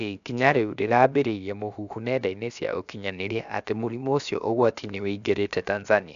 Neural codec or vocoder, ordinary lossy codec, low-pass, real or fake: codec, 16 kHz, about 1 kbps, DyCAST, with the encoder's durations; none; 7.2 kHz; fake